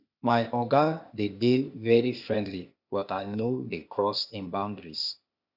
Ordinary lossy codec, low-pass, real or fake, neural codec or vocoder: none; 5.4 kHz; fake; codec, 16 kHz, 0.8 kbps, ZipCodec